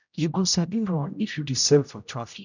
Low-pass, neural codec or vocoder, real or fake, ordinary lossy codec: 7.2 kHz; codec, 16 kHz, 0.5 kbps, X-Codec, HuBERT features, trained on general audio; fake; none